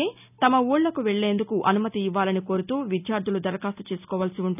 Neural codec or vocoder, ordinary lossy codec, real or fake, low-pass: none; none; real; 3.6 kHz